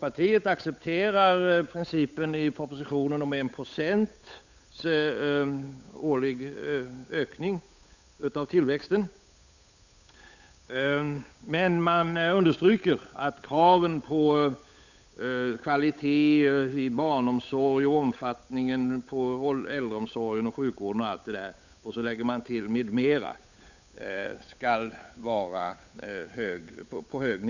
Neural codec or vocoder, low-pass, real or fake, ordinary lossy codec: codec, 16 kHz, 8 kbps, FunCodec, trained on Chinese and English, 25 frames a second; 7.2 kHz; fake; none